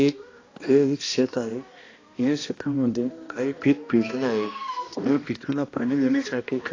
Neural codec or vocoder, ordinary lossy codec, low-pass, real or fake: codec, 16 kHz, 1 kbps, X-Codec, HuBERT features, trained on balanced general audio; AAC, 32 kbps; 7.2 kHz; fake